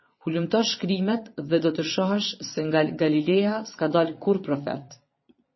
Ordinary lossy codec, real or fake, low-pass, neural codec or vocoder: MP3, 24 kbps; fake; 7.2 kHz; vocoder, 22.05 kHz, 80 mel bands, Vocos